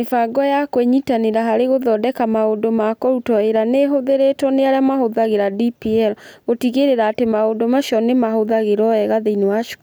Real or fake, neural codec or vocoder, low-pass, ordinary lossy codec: real; none; none; none